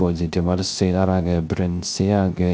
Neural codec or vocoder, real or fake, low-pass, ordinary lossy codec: codec, 16 kHz, 0.3 kbps, FocalCodec; fake; none; none